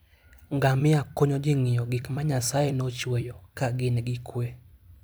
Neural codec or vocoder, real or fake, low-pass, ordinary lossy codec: vocoder, 44.1 kHz, 128 mel bands every 256 samples, BigVGAN v2; fake; none; none